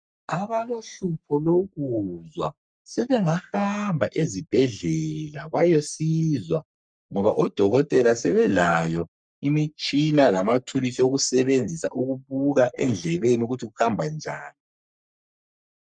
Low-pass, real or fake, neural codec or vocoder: 9.9 kHz; fake; codec, 44.1 kHz, 3.4 kbps, Pupu-Codec